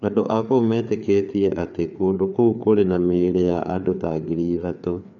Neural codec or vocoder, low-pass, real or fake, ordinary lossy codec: codec, 16 kHz, 4 kbps, FreqCodec, larger model; 7.2 kHz; fake; none